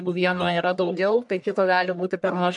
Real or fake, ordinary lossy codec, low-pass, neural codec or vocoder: fake; MP3, 96 kbps; 10.8 kHz; codec, 44.1 kHz, 1.7 kbps, Pupu-Codec